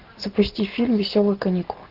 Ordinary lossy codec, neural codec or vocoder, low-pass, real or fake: Opus, 32 kbps; none; 5.4 kHz; real